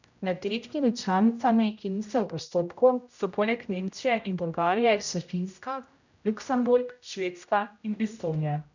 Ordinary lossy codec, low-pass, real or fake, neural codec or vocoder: none; 7.2 kHz; fake; codec, 16 kHz, 0.5 kbps, X-Codec, HuBERT features, trained on general audio